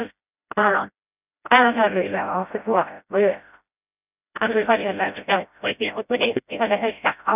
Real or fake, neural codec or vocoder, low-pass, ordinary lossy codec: fake; codec, 16 kHz, 0.5 kbps, FreqCodec, smaller model; 3.6 kHz; none